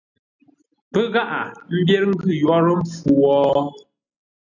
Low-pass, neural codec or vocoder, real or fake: 7.2 kHz; none; real